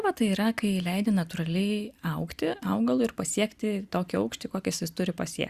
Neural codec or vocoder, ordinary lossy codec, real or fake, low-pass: none; Opus, 64 kbps; real; 14.4 kHz